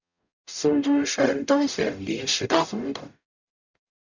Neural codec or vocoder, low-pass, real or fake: codec, 44.1 kHz, 0.9 kbps, DAC; 7.2 kHz; fake